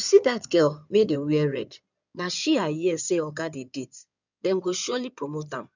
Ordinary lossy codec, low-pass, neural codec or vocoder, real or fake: none; 7.2 kHz; codec, 16 kHz in and 24 kHz out, 2.2 kbps, FireRedTTS-2 codec; fake